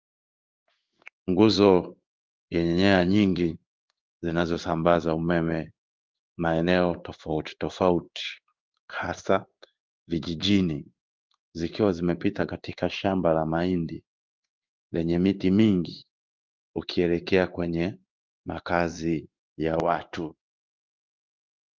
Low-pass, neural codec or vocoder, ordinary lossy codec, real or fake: 7.2 kHz; codec, 16 kHz in and 24 kHz out, 1 kbps, XY-Tokenizer; Opus, 24 kbps; fake